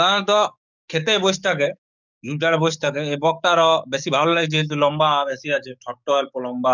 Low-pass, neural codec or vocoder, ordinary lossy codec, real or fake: 7.2 kHz; codec, 44.1 kHz, 7.8 kbps, DAC; none; fake